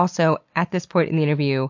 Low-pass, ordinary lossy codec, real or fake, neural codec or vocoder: 7.2 kHz; MP3, 48 kbps; real; none